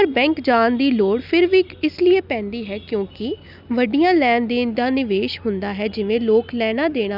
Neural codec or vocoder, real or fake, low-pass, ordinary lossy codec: none; real; 5.4 kHz; none